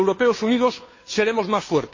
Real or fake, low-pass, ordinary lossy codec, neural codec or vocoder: fake; 7.2 kHz; MP3, 32 kbps; codec, 16 kHz, 2 kbps, FunCodec, trained on Chinese and English, 25 frames a second